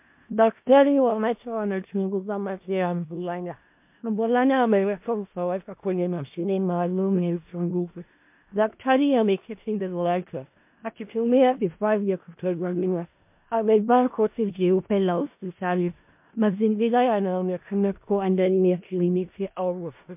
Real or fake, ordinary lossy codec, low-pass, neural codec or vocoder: fake; MP3, 32 kbps; 3.6 kHz; codec, 16 kHz in and 24 kHz out, 0.4 kbps, LongCat-Audio-Codec, four codebook decoder